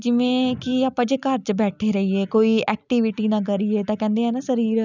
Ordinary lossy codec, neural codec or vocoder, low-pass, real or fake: none; none; 7.2 kHz; real